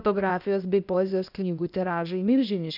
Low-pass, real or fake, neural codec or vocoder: 5.4 kHz; fake; codec, 16 kHz, 0.8 kbps, ZipCodec